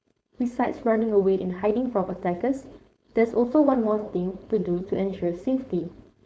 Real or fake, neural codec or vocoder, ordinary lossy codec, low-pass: fake; codec, 16 kHz, 4.8 kbps, FACodec; none; none